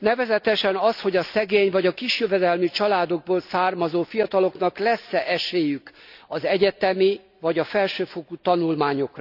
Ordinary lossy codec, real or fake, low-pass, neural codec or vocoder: none; real; 5.4 kHz; none